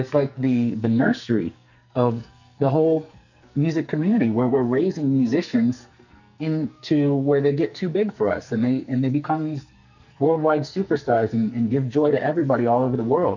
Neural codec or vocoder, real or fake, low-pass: codec, 44.1 kHz, 2.6 kbps, SNAC; fake; 7.2 kHz